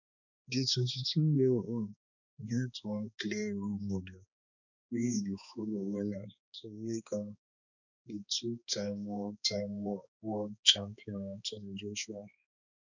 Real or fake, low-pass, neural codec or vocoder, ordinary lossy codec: fake; 7.2 kHz; codec, 16 kHz, 2 kbps, X-Codec, HuBERT features, trained on balanced general audio; none